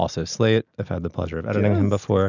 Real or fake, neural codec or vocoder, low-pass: real; none; 7.2 kHz